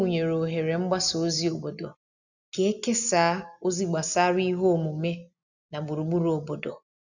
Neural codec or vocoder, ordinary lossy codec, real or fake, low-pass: none; none; real; 7.2 kHz